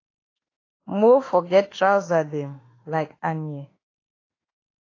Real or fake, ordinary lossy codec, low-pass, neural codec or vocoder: fake; AAC, 32 kbps; 7.2 kHz; autoencoder, 48 kHz, 32 numbers a frame, DAC-VAE, trained on Japanese speech